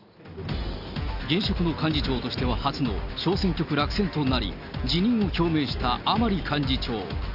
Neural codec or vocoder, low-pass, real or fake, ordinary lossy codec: none; 5.4 kHz; real; AAC, 48 kbps